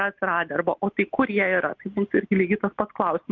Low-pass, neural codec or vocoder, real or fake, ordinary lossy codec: 7.2 kHz; none; real; Opus, 32 kbps